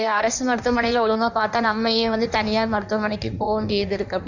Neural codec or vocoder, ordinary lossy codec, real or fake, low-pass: codec, 16 kHz in and 24 kHz out, 1.1 kbps, FireRedTTS-2 codec; none; fake; 7.2 kHz